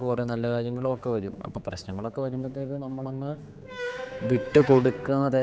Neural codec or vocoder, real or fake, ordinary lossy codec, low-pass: codec, 16 kHz, 4 kbps, X-Codec, HuBERT features, trained on general audio; fake; none; none